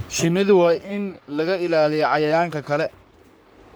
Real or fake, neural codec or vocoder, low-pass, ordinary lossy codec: fake; codec, 44.1 kHz, 3.4 kbps, Pupu-Codec; none; none